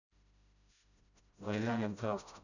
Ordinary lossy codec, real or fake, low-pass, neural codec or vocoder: none; fake; 7.2 kHz; codec, 16 kHz, 0.5 kbps, FreqCodec, smaller model